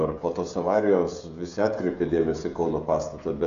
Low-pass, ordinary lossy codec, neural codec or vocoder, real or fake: 7.2 kHz; MP3, 96 kbps; codec, 16 kHz, 16 kbps, FreqCodec, smaller model; fake